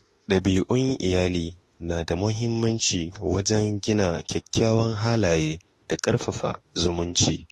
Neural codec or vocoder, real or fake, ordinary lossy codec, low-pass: autoencoder, 48 kHz, 32 numbers a frame, DAC-VAE, trained on Japanese speech; fake; AAC, 32 kbps; 19.8 kHz